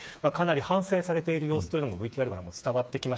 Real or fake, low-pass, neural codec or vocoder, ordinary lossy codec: fake; none; codec, 16 kHz, 4 kbps, FreqCodec, smaller model; none